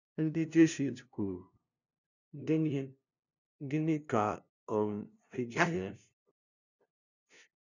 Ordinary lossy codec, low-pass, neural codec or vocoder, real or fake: none; 7.2 kHz; codec, 16 kHz, 0.5 kbps, FunCodec, trained on LibriTTS, 25 frames a second; fake